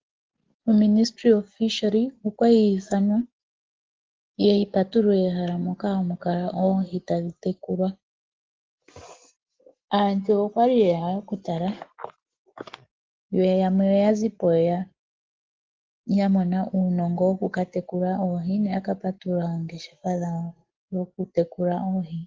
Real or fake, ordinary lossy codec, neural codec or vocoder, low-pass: real; Opus, 16 kbps; none; 7.2 kHz